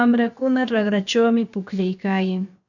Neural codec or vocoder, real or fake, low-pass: codec, 16 kHz, about 1 kbps, DyCAST, with the encoder's durations; fake; 7.2 kHz